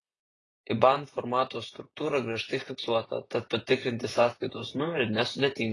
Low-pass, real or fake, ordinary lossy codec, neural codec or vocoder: 10.8 kHz; fake; AAC, 32 kbps; vocoder, 48 kHz, 128 mel bands, Vocos